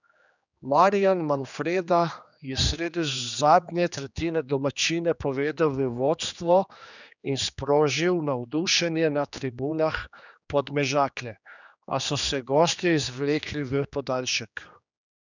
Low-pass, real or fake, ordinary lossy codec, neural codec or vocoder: 7.2 kHz; fake; none; codec, 16 kHz, 2 kbps, X-Codec, HuBERT features, trained on general audio